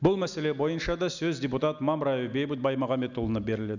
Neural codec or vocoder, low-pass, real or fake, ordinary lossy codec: none; 7.2 kHz; real; none